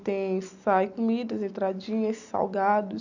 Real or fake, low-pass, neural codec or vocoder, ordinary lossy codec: real; 7.2 kHz; none; none